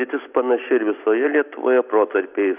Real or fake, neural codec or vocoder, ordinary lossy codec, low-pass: real; none; AAC, 32 kbps; 3.6 kHz